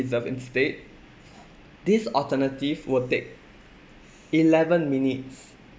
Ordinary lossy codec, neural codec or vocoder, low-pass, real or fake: none; none; none; real